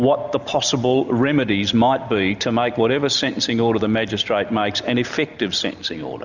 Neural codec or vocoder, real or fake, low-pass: none; real; 7.2 kHz